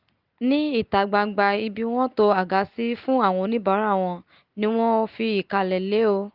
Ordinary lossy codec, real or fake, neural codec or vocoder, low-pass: Opus, 32 kbps; real; none; 5.4 kHz